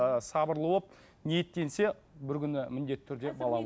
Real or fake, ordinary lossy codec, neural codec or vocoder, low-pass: real; none; none; none